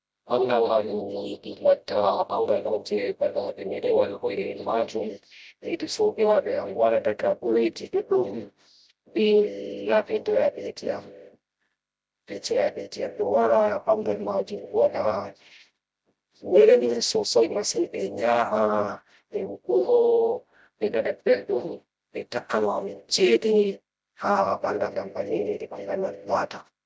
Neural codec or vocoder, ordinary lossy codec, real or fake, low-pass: codec, 16 kHz, 0.5 kbps, FreqCodec, smaller model; none; fake; none